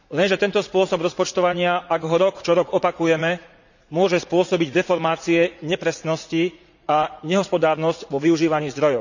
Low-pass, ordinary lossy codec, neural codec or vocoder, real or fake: 7.2 kHz; none; vocoder, 44.1 kHz, 80 mel bands, Vocos; fake